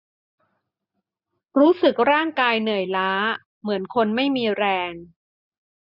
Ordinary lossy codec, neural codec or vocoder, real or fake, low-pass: none; none; real; 5.4 kHz